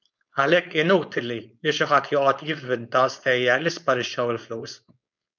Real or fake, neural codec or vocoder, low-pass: fake; codec, 16 kHz, 4.8 kbps, FACodec; 7.2 kHz